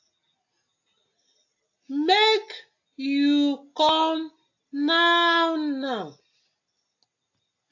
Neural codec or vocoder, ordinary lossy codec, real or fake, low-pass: none; AAC, 48 kbps; real; 7.2 kHz